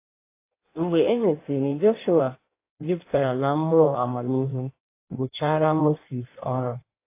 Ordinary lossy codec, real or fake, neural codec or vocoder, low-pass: AAC, 24 kbps; fake; codec, 16 kHz in and 24 kHz out, 1.1 kbps, FireRedTTS-2 codec; 3.6 kHz